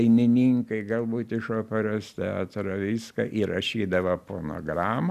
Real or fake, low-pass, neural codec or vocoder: real; 14.4 kHz; none